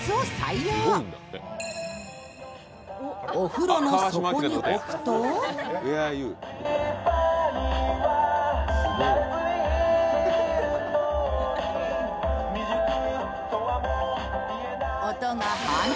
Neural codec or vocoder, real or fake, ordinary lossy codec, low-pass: none; real; none; none